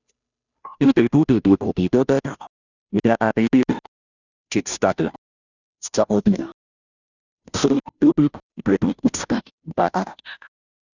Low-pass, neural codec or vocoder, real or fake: 7.2 kHz; codec, 16 kHz, 0.5 kbps, FunCodec, trained on Chinese and English, 25 frames a second; fake